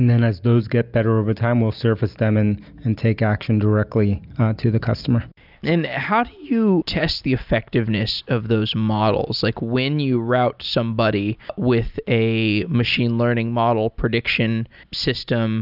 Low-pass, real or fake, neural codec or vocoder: 5.4 kHz; real; none